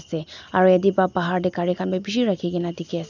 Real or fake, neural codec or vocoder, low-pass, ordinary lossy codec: real; none; 7.2 kHz; none